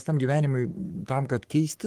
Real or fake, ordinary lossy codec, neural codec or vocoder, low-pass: fake; Opus, 24 kbps; codec, 44.1 kHz, 3.4 kbps, Pupu-Codec; 14.4 kHz